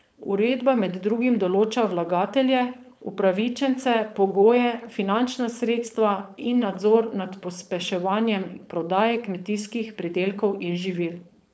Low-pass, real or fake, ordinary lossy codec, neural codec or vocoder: none; fake; none; codec, 16 kHz, 4.8 kbps, FACodec